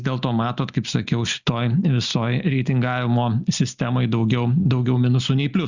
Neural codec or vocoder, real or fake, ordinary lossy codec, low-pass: none; real; Opus, 64 kbps; 7.2 kHz